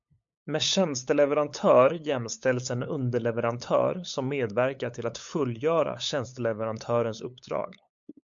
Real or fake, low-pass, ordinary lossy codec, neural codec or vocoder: fake; 7.2 kHz; MP3, 64 kbps; codec, 16 kHz, 8 kbps, FunCodec, trained on LibriTTS, 25 frames a second